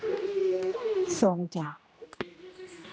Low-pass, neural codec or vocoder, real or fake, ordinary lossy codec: none; codec, 16 kHz, 1 kbps, X-Codec, HuBERT features, trained on general audio; fake; none